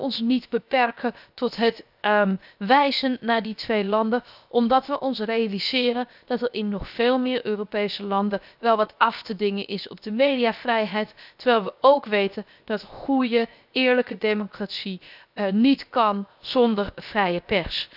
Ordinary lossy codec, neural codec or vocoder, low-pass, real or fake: none; codec, 16 kHz, 0.7 kbps, FocalCodec; 5.4 kHz; fake